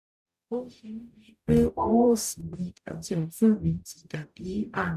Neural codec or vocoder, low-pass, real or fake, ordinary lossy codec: codec, 44.1 kHz, 0.9 kbps, DAC; 14.4 kHz; fake; none